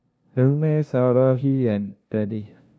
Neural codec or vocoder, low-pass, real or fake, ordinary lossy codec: codec, 16 kHz, 0.5 kbps, FunCodec, trained on LibriTTS, 25 frames a second; none; fake; none